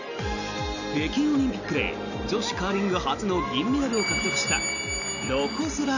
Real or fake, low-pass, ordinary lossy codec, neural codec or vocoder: real; 7.2 kHz; none; none